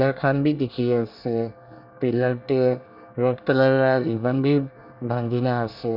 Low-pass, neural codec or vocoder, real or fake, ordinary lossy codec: 5.4 kHz; codec, 24 kHz, 1 kbps, SNAC; fake; none